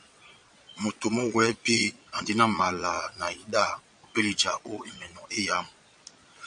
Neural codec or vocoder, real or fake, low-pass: vocoder, 22.05 kHz, 80 mel bands, Vocos; fake; 9.9 kHz